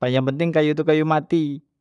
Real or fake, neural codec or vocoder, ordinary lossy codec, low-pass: fake; codec, 44.1 kHz, 7.8 kbps, Pupu-Codec; none; 10.8 kHz